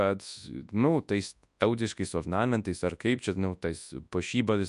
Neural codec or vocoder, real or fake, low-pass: codec, 24 kHz, 0.9 kbps, WavTokenizer, large speech release; fake; 10.8 kHz